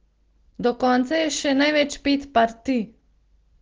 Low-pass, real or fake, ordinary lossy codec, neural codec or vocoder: 7.2 kHz; real; Opus, 16 kbps; none